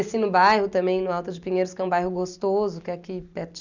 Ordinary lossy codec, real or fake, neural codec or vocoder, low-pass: none; real; none; 7.2 kHz